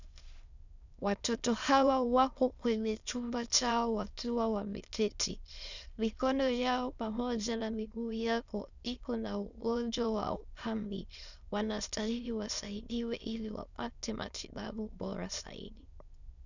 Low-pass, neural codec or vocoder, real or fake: 7.2 kHz; autoencoder, 22.05 kHz, a latent of 192 numbers a frame, VITS, trained on many speakers; fake